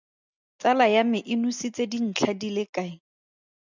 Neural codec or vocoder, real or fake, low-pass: none; real; 7.2 kHz